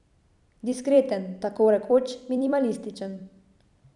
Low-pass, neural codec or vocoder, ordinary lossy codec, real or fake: 10.8 kHz; vocoder, 44.1 kHz, 128 mel bands every 256 samples, BigVGAN v2; none; fake